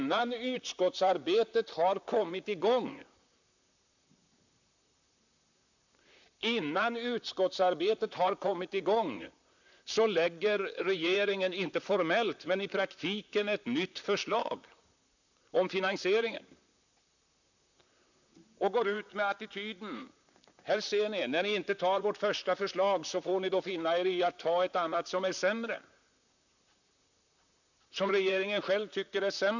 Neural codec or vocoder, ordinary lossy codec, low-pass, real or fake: vocoder, 44.1 kHz, 128 mel bands, Pupu-Vocoder; none; 7.2 kHz; fake